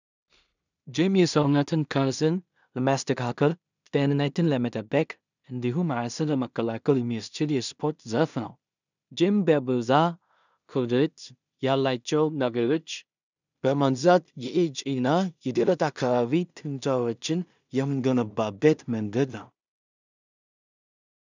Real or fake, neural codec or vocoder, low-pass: fake; codec, 16 kHz in and 24 kHz out, 0.4 kbps, LongCat-Audio-Codec, two codebook decoder; 7.2 kHz